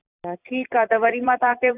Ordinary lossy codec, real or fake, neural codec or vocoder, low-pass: none; fake; vocoder, 44.1 kHz, 128 mel bands every 256 samples, BigVGAN v2; 3.6 kHz